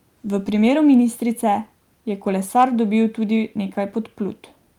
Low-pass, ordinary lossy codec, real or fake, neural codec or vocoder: 19.8 kHz; Opus, 32 kbps; real; none